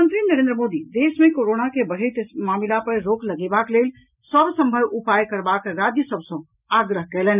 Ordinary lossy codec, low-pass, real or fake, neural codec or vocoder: none; 3.6 kHz; real; none